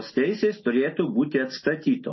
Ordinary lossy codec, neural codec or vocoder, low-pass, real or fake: MP3, 24 kbps; none; 7.2 kHz; real